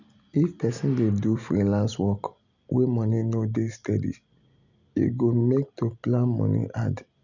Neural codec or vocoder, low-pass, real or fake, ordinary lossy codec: none; 7.2 kHz; real; none